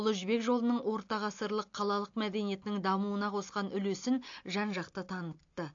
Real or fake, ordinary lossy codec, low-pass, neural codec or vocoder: real; AAC, 48 kbps; 7.2 kHz; none